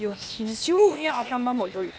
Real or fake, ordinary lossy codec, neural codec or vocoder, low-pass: fake; none; codec, 16 kHz, 0.8 kbps, ZipCodec; none